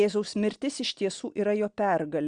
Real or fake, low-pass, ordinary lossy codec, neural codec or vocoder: real; 9.9 kHz; Opus, 64 kbps; none